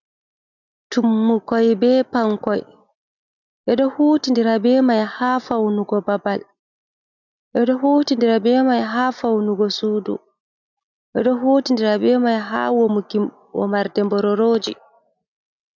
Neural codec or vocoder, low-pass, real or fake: none; 7.2 kHz; real